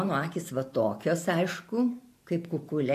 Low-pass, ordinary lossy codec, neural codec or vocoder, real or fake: 14.4 kHz; AAC, 64 kbps; vocoder, 44.1 kHz, 128 mel bands every 512 samples, BigVGAN v2; fake